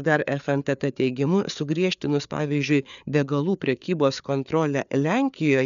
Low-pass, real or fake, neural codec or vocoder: 7.2 kHz; fake; codec, 16 kHz, 4 kbps, FreqCodec, larger model